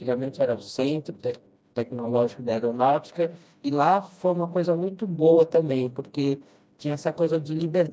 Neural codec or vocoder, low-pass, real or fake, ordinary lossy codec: codec, 16 kHz, 1 kbps, FreqCodec, smaller model; none; fake; none